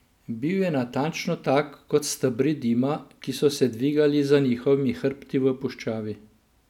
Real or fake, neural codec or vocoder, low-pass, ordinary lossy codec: real; none; 19.8 kHz; none